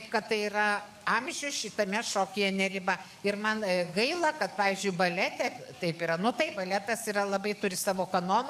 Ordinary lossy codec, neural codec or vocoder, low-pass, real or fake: MP3, 96 kbps; codec, 44.1 kHz, 7.8 kbps, DAC; 14.4 kHz; fake